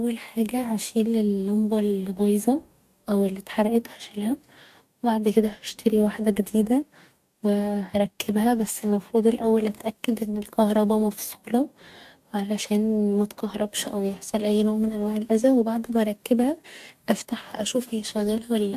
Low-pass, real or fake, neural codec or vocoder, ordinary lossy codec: 14.4 kHz; fake; codec, 44.1 kHz, 2.6 kbps, DAC; AAC, 96 kbps